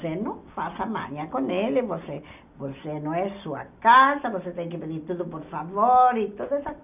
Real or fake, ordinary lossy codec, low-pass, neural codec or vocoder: real; none; 3.6 kHz; none